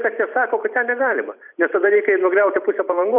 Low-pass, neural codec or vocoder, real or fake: 3.6 kHz; vocoder, 44.1 kHz, 128 mel bands every 256 samples, BigVGAN v2; fake